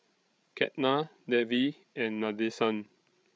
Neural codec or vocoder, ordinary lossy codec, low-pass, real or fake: codec, 16 kHz, 16 kbps, FreqCodec, larger model; none; none; fake